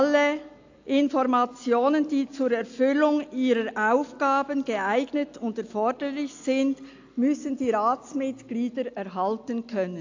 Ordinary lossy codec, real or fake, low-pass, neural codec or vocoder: AAC, 48 kbps; real; 7.2 kHz; none